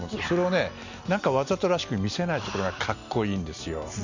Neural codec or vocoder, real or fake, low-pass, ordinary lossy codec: none; real; 7.2 kHz; Opus, 64 kbps